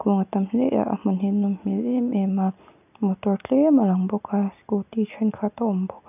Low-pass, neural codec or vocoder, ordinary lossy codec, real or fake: 3.6 kHz; none; none; real